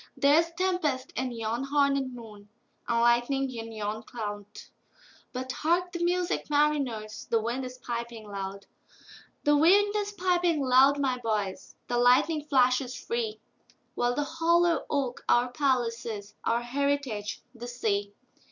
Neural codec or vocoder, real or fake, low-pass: none; real; 7.2 kHz